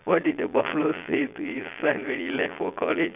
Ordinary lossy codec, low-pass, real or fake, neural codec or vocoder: none; 3.6 kHz; fake; vocoder, 22.05 kHz, 80 mel bands, Vocos